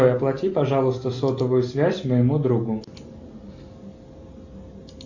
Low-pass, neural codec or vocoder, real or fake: 7.2 kHz; none; real